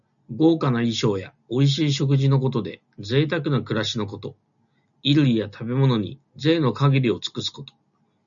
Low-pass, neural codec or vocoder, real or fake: 7.2 kHz; none; real